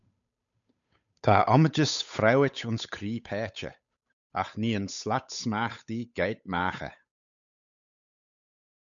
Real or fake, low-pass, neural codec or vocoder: fake; 7.2 kHz; codec, 16 kHz, 8 kbps, FunCodec, trained on Chinese and English, 25 frames a second